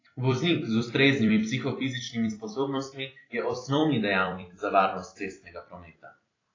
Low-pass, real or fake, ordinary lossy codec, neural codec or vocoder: 7.2 kHz; fake; AAC, 32 kbps; vocoder, 44.1 kHz, 128 mel bands every 256 samples, BigVGAN v2